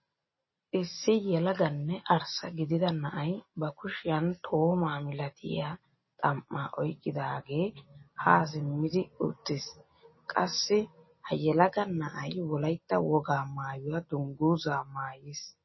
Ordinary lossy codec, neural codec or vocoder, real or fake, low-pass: MP3, 24 kbps; none; real; 7.2 kHz